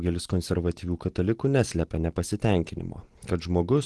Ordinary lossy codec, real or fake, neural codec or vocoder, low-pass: Opus, 16 kbps; real; none; 10.8 kHz